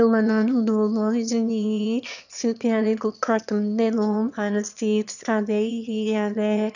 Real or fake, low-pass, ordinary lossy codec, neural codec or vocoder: fake; 7.2 kHz; none; autoencoder, 22.05 kHz, a latent of 192 numbers a frame, VITS, trained on one speaker